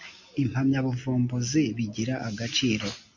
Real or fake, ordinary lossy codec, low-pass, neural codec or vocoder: real; MP3, 64 kbps; 7.2 kHz; none